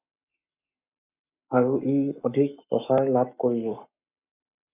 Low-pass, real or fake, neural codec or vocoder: 3.6 kHz; fake; codec, 44.1 kHz, 7.8 kbps, Pupu-Codec